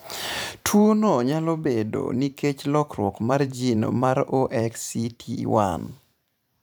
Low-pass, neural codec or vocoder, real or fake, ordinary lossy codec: none; vocoder, 44.1 kHz, 128 mel bands every 512 samples, BigVGAN v2; fake; none